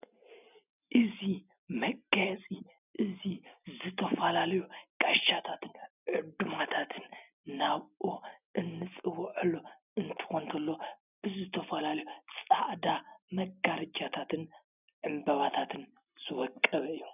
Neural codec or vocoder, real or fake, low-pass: none; real; 3.6 kHz